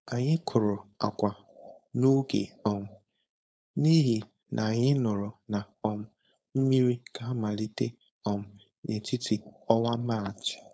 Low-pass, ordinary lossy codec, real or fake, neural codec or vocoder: none; none; fake; codec, 16 kHz, 4.8 kbps, FACodec